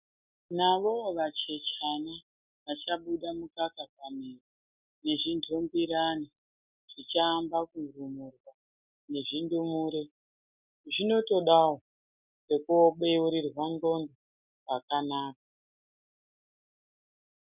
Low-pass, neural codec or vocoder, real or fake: 3.6 kHz; none; real